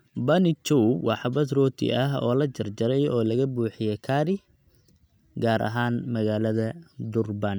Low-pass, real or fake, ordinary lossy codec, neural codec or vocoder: none; real; none; none